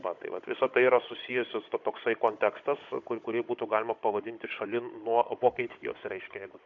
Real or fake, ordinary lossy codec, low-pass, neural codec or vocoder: fake; MP3, 48 kbps; 7.2 kHz; codec, 16 kHz, 16 kbps, FunCodec, trained on Chinese and English, 50 frames a second